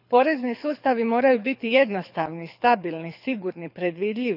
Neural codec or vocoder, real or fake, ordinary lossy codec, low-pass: codec, 24 kHz, 6 kbps, HILCodec; fake; none; 5.4 kHz